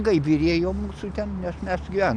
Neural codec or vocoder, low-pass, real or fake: none; 9.9 kHz; real